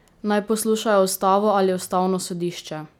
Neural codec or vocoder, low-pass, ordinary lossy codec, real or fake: none; 19.8 kHz; none; real